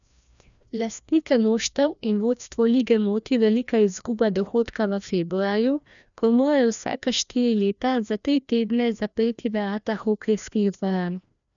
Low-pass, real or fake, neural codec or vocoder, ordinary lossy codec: 7.2 kHz; fake; codec, 16 kHz, 1 kbps, FreqCodec, larger model; none